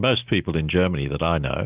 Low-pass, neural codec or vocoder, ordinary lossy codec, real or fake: 3.6 kHz; none; Opus, 32 kbps; real